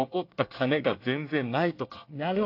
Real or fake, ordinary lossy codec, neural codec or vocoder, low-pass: fake; AAC, 32 kbps; codec, 24 kHz, 1 kbps, SNAC; 5.4 kHz